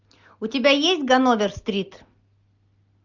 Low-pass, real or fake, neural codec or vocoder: 7.2 kHz; real; none